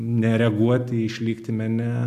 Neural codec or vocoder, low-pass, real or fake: none; 14.4 kHz; real